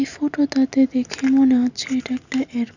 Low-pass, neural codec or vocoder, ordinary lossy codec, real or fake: 7.2 kHz; none; none; real